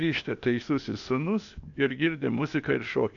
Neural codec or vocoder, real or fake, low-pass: codec, 16 kHz, 0.8 kbps, ZipCodec; fake; 7.2 kHz